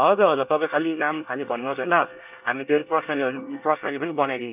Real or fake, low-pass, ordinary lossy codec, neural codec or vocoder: fake; 3.6 kHz; none; codec, 24 kHz, 1 kbps, SNAC